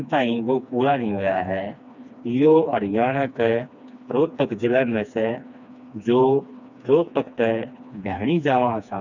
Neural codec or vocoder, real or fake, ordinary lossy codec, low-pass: codec, 16 kHz, 2 kbps, FreqCodec, smaller model; fake; none; 7.2 kHz